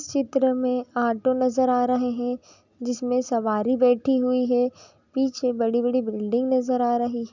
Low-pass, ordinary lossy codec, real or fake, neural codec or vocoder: 7.2 kHz; none; real; none